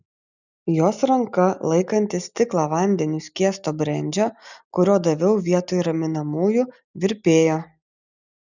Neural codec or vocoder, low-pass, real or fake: none; 7.2 kHz; real